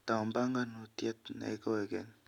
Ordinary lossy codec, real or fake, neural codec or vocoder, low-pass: none; real; none; 19.8 kHz